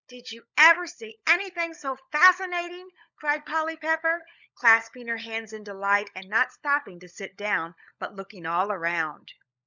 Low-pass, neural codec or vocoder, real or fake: 7.2 kHz; codec, 16 kHz, 16 kbps, FunCodec, trained on LibriTTS, 50 frames a second; fake